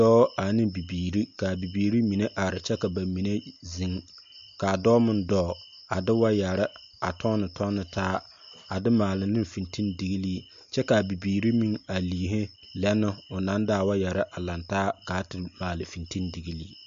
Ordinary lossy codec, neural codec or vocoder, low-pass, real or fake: MP3, 48 kbps; none; 7.2 kHz; real